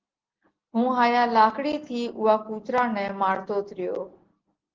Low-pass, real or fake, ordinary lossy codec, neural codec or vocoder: 7.2 kHz; real; Opus, 16 kbps; none